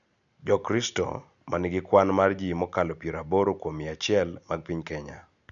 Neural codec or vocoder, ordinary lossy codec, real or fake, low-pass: none; none; real; 7.2 kHz